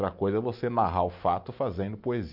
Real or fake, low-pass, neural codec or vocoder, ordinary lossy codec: real; 5.4 kHz; none; none